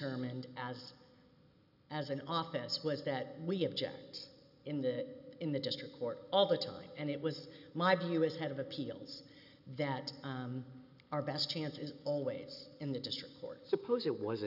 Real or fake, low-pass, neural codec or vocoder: real; 5.4 kHz; none